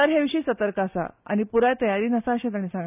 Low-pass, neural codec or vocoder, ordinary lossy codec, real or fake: 3.6 kHz; none; none; real